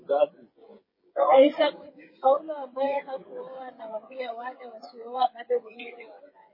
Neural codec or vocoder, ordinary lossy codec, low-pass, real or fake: codec, 16 kHz, 16 kbps, FreqCodec, smaller model; MP3, 24 kbps; 5.4 kHz; fake